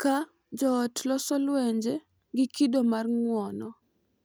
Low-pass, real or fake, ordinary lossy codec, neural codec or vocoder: none; real; none; none